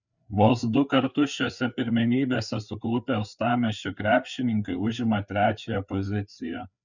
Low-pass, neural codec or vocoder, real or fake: 7.2 kHz; codec, 16 kHz, 4 kbps, FreqCodec, larger model; fake